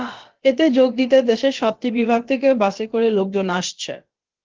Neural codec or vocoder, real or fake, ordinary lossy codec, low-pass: codec, 16 kHz, about 1 kbps, DyCAST, with the encoder's durations; fake; Opus, 16 kbps; 7.2 kHz